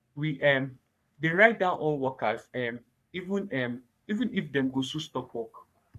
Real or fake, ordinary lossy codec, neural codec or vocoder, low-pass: fake; AAC, 96 kbps; codec, 44.1 kHz, 3.4 kbps, Pupu-Codec; 14.4 kHz